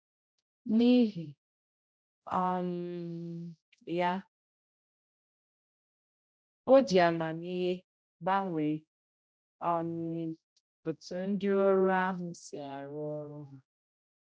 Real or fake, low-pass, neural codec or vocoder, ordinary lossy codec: fake; none; codec, 16 kHz, 0.5 kbps, X-Codec, HuBERT features, trained on general audio; none